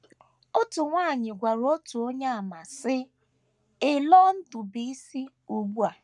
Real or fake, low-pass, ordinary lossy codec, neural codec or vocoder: fake; 10.8 kHz; none; codec, 44.1 kHz, 7.8 kbps, Pupu-Codec